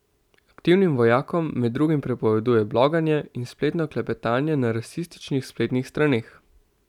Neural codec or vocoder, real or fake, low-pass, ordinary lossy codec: none; real; 19.8 kHz; none